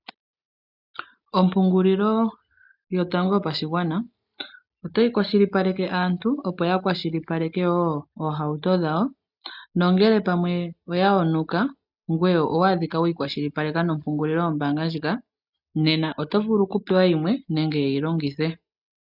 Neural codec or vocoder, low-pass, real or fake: none; 5.4 kHz; real